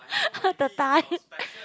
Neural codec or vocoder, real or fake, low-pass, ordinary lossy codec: none; real; none; none